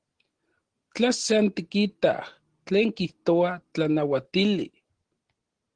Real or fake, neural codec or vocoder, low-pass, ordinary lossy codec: real; none; 9.9 kHz; Opus, 16 kbps